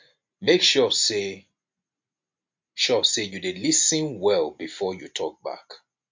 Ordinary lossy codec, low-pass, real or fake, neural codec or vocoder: MP3, 48 kbps; 7.2 kHz; real; none